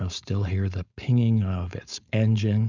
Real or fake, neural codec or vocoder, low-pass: fake; codec, 16 kHz, 4.8 kbps, FACodec; 7.2 kHz